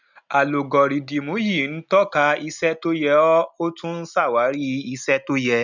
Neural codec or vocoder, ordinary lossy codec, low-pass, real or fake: none; none; 7.2 kHz; real